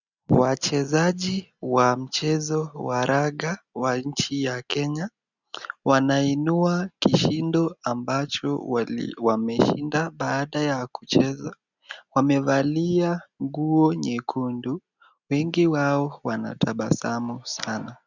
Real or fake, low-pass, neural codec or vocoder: real; 7.2 kHz; none